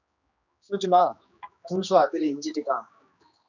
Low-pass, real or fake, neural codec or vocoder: 7.2 kHz; fake; codec, 16 kHz, 2 kbps, X-Codec, HuBERT features, trained on general audio